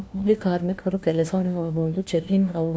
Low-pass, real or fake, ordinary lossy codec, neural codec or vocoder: none; fake; none; codec, 16 kHz, 1 kbps, FunCodec, trained on LibriTTS, 50 frames a second